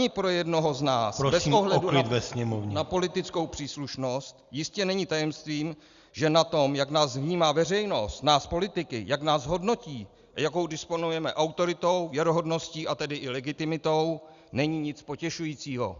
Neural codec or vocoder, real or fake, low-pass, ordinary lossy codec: none; real; 7.2 kHz; Opus, 64 kbps